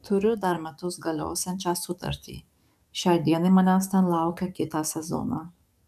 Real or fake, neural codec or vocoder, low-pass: fake; codec, 44.1 kHz, 7.8 kbps, DAC; 14.4 kHz